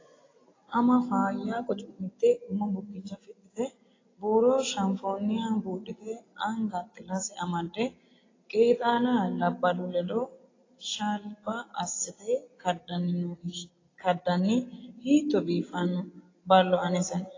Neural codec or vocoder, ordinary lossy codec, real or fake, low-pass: none; AAC, 32 kbps; real; 7.2 kHz